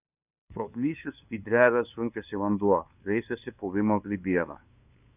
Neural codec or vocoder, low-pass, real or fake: codec, 16 kHz, 2 kbps, FunCodec, trained on LibriTTS, 25 frames a second; 3.6 kHz; fake